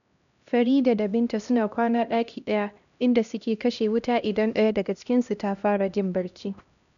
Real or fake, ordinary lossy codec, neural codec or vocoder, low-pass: fake; none; codec, 16 kHz, 1 kbps, X-Codec, HuBERT features, trained on LibriSpeech; 7.2 kHz